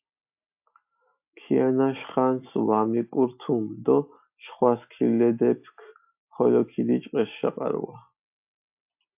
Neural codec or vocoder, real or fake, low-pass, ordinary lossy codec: none; real; 3.6 kHz; AAC, 32 kbps